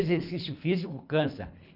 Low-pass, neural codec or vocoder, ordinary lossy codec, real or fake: 5.4 kHz; codec, 24 kHz, 3 kbps, HILCodec; none; fake